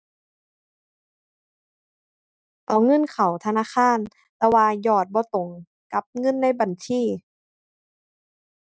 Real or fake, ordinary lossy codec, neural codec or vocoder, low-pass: real; none; none; none